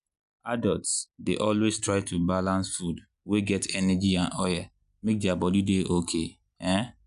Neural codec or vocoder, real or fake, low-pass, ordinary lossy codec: none; real; 10.8 kHz; none